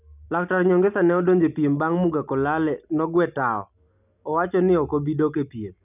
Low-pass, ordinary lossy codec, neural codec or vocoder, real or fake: 3.6 kHz; none; none; real